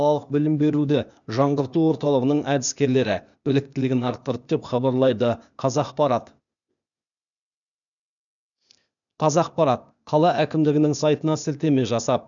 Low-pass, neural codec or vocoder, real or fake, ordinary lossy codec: 7.2 kHz; codec, 16 kHz, 0.8 kbps, ZipCodec; fake; none